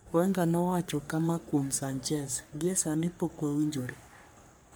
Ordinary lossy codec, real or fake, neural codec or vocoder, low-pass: none; fake; codec, 44.1 kHz, 3.4 kbps, Pupu-Codec; none